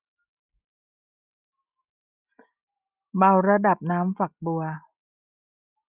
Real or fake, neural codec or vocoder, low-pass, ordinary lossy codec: real; none; 3.6 kHz; Opus, 64 kbps